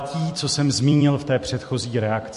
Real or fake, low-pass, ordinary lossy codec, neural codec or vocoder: fake; 14.4 kHz; MP3, 48 kbps; vocoder, 44.1 kHz, 128 mel bands every 256 samples, BigVGAN v2